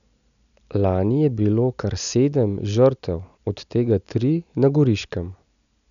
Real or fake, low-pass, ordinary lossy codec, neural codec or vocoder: real; 7.2 kHz; none; none